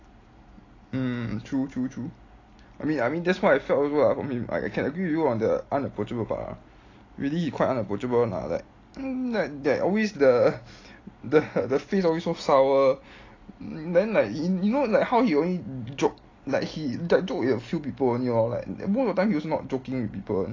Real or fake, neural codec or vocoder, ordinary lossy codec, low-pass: real; none; AAC, 32 kbps; 7.2 kHz